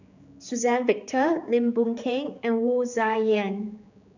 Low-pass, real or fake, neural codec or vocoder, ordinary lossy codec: 7.2 kHz; fake; codec, 16 kHz, 4 kbps, X-Codec, HuBERT features, trained on general audio; none